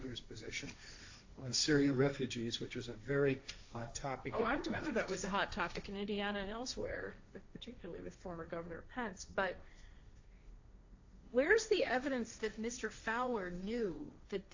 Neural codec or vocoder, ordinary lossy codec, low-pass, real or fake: codec, 16 kHz, 1.1 kbps, Voila-Tokenizer; AAC, 48 kbps; 7.2 kHz; fake